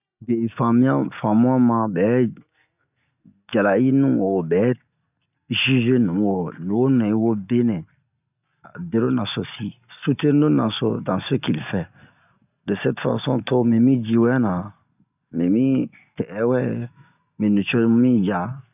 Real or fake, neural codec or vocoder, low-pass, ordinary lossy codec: real; none; 3.6 kHz; none